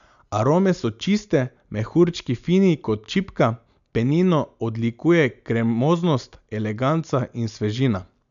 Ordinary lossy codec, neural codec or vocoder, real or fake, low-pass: none; none; real; 7.2 kHz